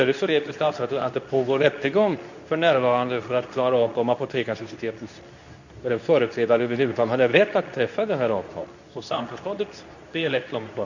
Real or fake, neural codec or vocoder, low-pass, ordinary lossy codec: fake; codec, 24 kHz, 0.9 kbps, WavTokenizer, medium speech release version 2; 7.2 kHz; none